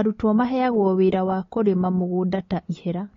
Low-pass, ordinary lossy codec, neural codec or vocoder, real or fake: 7.2 kHz; AAC, 32 kbps; none; real